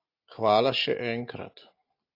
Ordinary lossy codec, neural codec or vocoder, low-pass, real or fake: AAC, 32 kbps; none; 5.4 kHz; real